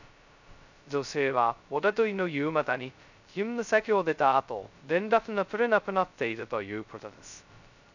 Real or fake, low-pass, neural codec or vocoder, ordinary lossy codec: fake; 7.2 kHz; codec, 16 kHz, 0.2 kbps, FocalCodec; none